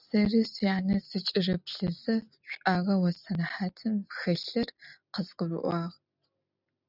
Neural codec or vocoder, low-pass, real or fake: none; 5.4 kHz; real